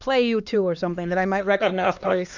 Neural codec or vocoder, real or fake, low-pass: codec, 16 kHz, 2 kbps, X-Codec, HuBERT features, trained on LibriSpeech; fake; 7.2 kHz